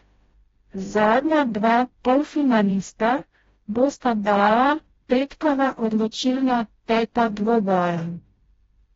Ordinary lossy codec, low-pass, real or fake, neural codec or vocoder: AAC, 24 kbps; 7.2 kHz; fake; codec, 16 kHz, 0.5 kbps, FreqCodec, smaller model